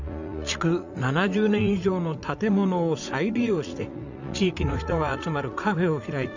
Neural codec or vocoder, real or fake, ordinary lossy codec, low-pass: vocoder, 44.1 kHz, 80 mel bands, Vocos; fake; none; 7.2 kHz